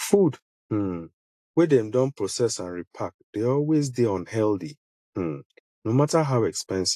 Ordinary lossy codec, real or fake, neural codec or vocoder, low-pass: AAC, 64 kbps; real; none; 14.4 kHz